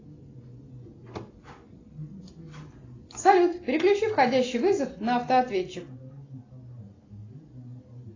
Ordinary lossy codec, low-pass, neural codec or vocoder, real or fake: AAC, 32 kbps; 7.2 kHz; none; real